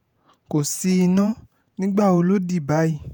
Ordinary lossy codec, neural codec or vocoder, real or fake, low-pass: none; vocoder, 48 kHz, 128 mel bands, Vocos; fake; none